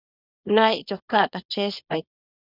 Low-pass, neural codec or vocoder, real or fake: 5.4 kHz; codec, 24 kHz, 0.9 kbps, WavTokenizer, small release; fake